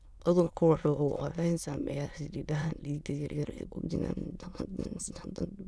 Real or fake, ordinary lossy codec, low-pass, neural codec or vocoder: fake; none; none; autoencoder, 22.05 kHz, a latent of 192 numbers a frame, VITS, trained on many speakers